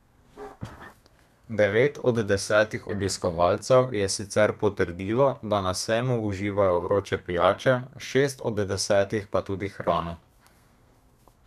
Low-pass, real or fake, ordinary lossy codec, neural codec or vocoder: 14.4 kHz; fake; none; codec, 32 kHz, 1.9 kbps, SNAC